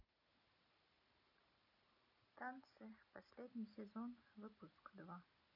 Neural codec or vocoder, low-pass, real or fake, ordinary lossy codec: none; 5.4 kHz; real; none